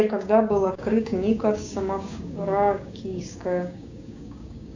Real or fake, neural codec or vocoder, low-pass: fake; codec, 16 kHz, 6 kbps, DAC; 7.2 kHz